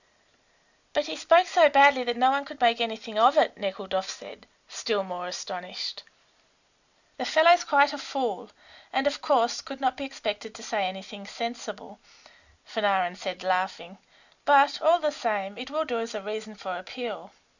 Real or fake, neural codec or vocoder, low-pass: real; none; 7.2 kHz